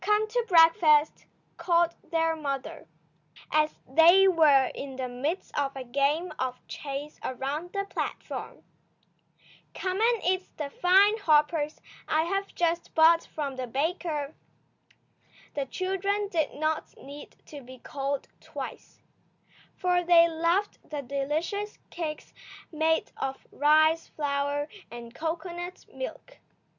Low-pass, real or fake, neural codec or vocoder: 7.2 kHz; real; none